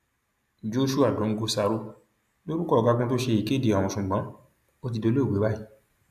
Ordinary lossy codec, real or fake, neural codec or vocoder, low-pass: none; real; none; 14.4 kHz